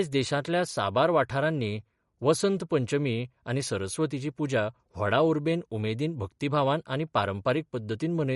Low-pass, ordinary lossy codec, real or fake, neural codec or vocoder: 19.8 kHz; MP3, 48 kbps; real; none